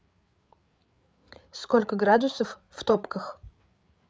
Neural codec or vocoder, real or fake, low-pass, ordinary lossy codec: codec, 16 kHz, 8 kbps, FreqCodec, larger model; fake; none; none